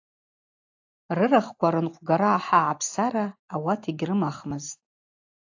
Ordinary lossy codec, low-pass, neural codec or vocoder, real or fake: AAC, 48 kbps; 7.2 kHz; none; real